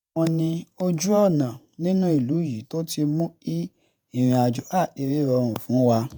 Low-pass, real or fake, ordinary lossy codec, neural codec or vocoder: none; fake; none; vocoder, 48 kHz, 128 mel bands, Vocos